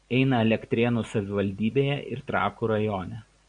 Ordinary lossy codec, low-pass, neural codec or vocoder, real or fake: MP3, 96 kbps; 9.9 kHz; none; real